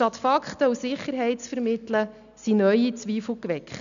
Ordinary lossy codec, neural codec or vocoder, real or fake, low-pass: none; none; real; 7.2 kHz